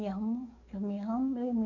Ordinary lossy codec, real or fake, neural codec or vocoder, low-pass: none; fake; codec, 44.1 kHz, 7.8 kbps, DAC; 7.2 kHz